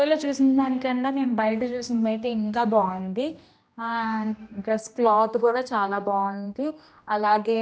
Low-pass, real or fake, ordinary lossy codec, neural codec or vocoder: none; fake; none; codec, 16 kHz, 1 kbps, X-Codec, HuBERT features, trained on general audio